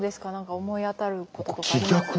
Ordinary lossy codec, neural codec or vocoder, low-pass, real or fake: none; none; none; real